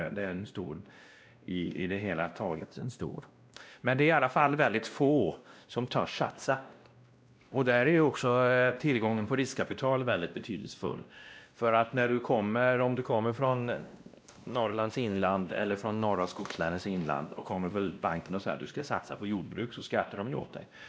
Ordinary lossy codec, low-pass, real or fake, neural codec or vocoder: none; none; fake; codec, 16 kHz, 1 kbps, X-Codec, WavLM features, trained on Multilingual LibriSpeech